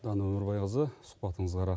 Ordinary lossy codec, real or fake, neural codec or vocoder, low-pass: none; real; none; none